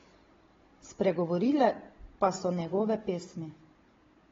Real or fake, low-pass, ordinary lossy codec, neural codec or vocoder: fake; 7.2 kHz; AAC, 24 kbps; codec, 16 kHz, 16 kbps, FunCodec, trained on Chinese and English, 50 frames a second